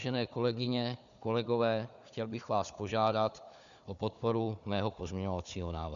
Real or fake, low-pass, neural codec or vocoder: fake; 7.2 kHz; codec, 16 kHz, 4 kbps, FunCodec, trained on Chinese and English, 50 frames a second